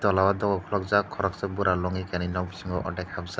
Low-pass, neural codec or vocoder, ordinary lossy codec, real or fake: none; none; none; real